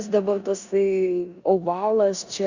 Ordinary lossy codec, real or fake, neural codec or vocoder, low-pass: Opus, 64 kbps; fake; codec, 16 kHz in and 24 kHz out, 0.9 kbps, LongCat-Audio-Codec, four codebook decoder; 7.2 kHz